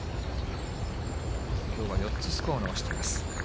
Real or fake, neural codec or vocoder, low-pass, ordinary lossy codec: real; none; none; none